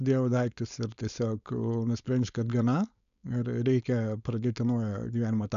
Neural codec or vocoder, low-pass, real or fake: codec, 16 kHz, 4.8 kbps, FACodec; 7.2 kHz; fake